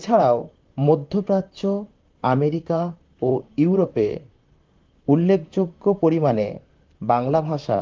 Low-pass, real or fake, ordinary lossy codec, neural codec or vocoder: 7.2 kHz; fake; Opus, 32 kbps; vocoder, 44.1 kHz, 128 mel bands, Pupu-Vocoder